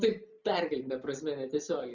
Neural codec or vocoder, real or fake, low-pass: none; real; 7.2 kHz